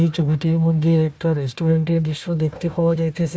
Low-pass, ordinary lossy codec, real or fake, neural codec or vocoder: none; none; fake; codec, 16 kHz, 1 kbps, FunCodec, trained on Chinese and English, 50 frames a second